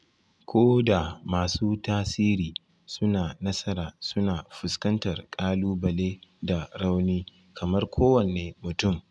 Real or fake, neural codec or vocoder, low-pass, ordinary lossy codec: real; none; none; none